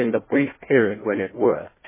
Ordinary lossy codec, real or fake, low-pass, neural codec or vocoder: MP3, 16 kbps; fake; 3.6 kHz; codec, 16 kHz, 1 kbps, FunCodec, trained on Chinese and English, 50 frames a second